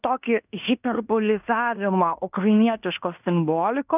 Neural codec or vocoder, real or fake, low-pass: codec, 16 kHz in and 24 kHz out, 0.9 kbps, LongCat-Audio-Codec, fine tuned four codebook decoder; fake; 3.6 kHz